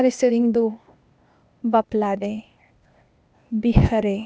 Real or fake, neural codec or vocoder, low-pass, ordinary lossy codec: fake; codec, 16 kHz, 0.8 kbps, ZipCodec; none; none